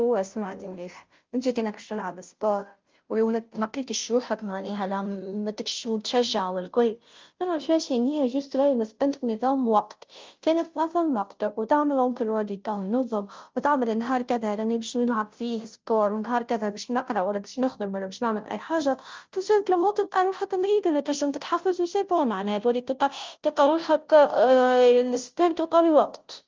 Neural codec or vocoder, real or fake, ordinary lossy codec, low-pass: codec, 16 kHz, 0.5 kbps, FunCodec, trained on Chinese and English, 25 frames a second; fake; Opus, 32 kbps; 7.2 kHz